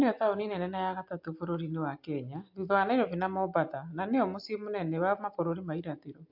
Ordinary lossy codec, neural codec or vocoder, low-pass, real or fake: MP3, 48 kbps; none; 5.4 kHz; real